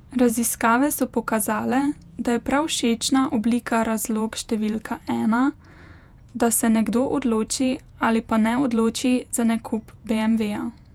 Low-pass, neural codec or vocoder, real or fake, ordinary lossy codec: 19.8 kHz; vocoder, 48 kHz, 128 mel bands, Vocos; fake; none